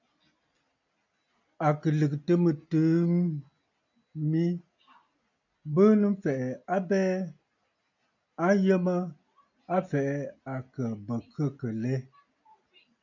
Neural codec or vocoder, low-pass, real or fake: none; 7.2 kHz; real